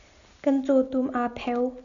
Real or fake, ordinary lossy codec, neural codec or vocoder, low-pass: real; MP3, 96 kbps; none; 7.2 kHz